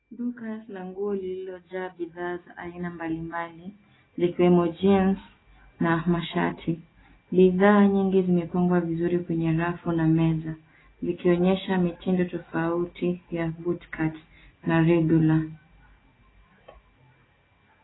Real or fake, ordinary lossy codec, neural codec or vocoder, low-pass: real; AAC, 16 kbps; none; 7.2 kHz